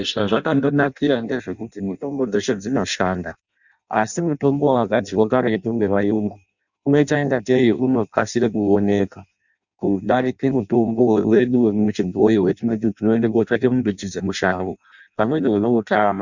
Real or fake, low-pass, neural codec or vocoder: fake; 7.2 kHz; codec, 16 kHz in and 24 kHz out, 0.6 kbps, FireRedTTS-2 codec